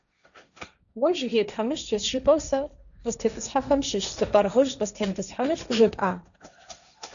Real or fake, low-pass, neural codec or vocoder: fake; 7.2 kHz; codec, 16 kHz, 1.1 kbps, Voila-Tokenizer